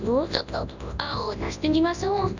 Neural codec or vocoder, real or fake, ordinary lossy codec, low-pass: codec, 24 kHz, 0.9 kbps, WavTokenizer, large speech release; fake; none; 7.2 kHz